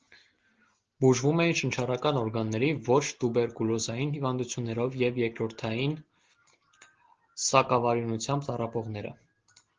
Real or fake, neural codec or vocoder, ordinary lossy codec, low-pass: real; none; Opus, 16 kbps; 7.2 kHz